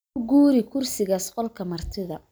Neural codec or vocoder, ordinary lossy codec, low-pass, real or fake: none; none; none; real